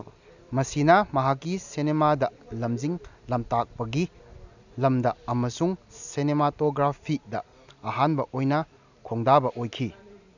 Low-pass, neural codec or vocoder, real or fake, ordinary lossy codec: 7.2 kHz; none; real; none